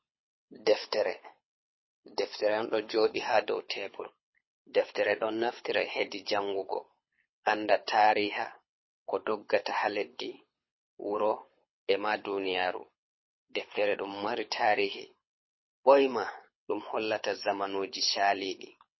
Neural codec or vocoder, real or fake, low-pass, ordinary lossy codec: codec, 24 kHz, 6 kbps, HILCodec; fake; 7.2 kHz; MP3, 24 kbps